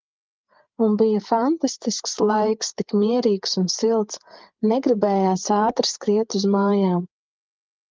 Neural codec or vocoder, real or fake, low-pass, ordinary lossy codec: codec, 16 kHz, 8 kbps, FreqCodec, larger model; fake; 7.2 kHz; Opus, 24 kbps